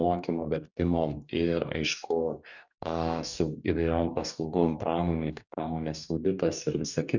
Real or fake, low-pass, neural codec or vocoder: fake; 7.2 kHz; codec, 44.1 kHz, 2.6 kbps, DAC